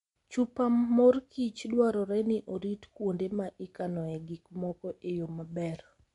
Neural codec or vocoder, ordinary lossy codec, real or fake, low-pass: none; MP3, 64 kbps; real; 10.8 kHz